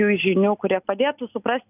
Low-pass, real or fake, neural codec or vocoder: 3.6 kHz; real; none